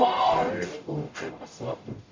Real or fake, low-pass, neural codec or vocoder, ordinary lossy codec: fake; 7.2 kHz; codec, 44.1 kHz, 0.9 kbps, DAC; MP3, 64 kbps